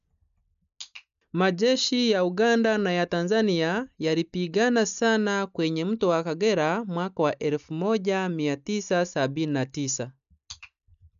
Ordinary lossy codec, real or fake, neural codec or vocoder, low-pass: none; real; none; 7.2 kHz